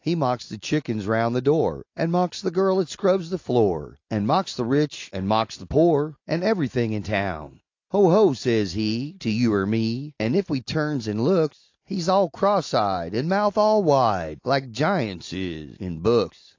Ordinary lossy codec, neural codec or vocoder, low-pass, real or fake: AAC, 48 kbps; none; 7.2 kHz; real